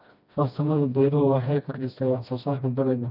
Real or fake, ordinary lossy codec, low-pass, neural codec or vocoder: fake; none; 5.4 kHz; codec, 16 kHz, 1 kbps, FreqCodec, smaller model